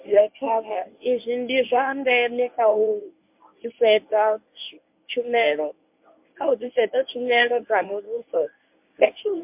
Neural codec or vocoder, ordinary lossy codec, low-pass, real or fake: codec, 24 kHz, 0.9 kbps, WavTokenizer, medium speech release version 1; MP3, 32 kbps; 3.6 kHz; fake